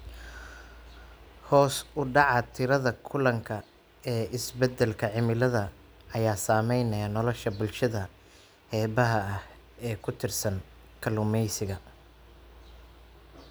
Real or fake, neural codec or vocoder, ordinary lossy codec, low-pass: real; none; none; none